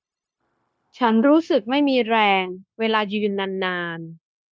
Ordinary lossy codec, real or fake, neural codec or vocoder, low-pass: none; fake; codec, 16 kHz, 0.9 kbps, LongCat-Audio-Codec; none